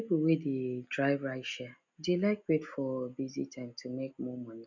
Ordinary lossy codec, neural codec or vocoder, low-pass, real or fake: none; none; 7.2 kHz; real